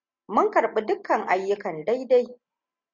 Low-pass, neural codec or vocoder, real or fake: 7.2 kHz; none; real